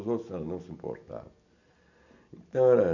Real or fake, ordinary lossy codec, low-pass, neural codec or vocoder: real; none; 7.2 kHz; none